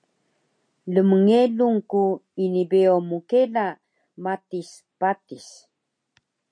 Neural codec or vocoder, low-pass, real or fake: none; 9.9 kHz; real